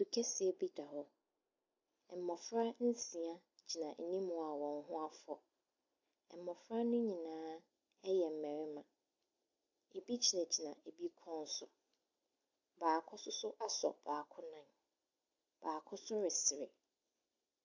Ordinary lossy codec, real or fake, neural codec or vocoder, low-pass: AAC, 48 kbps; real; none; 7.2 kHz